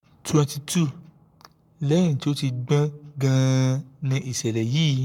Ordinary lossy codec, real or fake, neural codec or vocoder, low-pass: MP3, 96 kbps; fake; codec, 44.1 kHz, 7.8 kbps, Pupu-Codec; 19.8 kHz